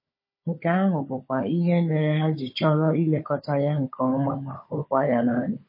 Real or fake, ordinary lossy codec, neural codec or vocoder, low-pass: fake; MP3, 24 kbps; codec, 16 kHz, 16 kbps, FunCodec, trained on Chinese and English, 50 frames a second; 5.4 kHz